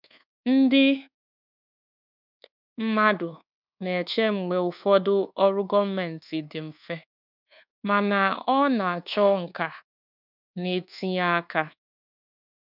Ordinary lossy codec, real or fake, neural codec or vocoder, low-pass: none; fake; codec, 24 kHz, 1.2 kbps, DualCodec; 5.4 kHz